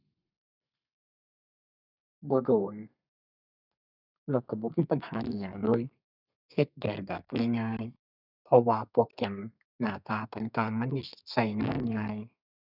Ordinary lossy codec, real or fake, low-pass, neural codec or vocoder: none; fake; 5.4 kHz; codec, 32 kHz, 1.9 kbps, SNAC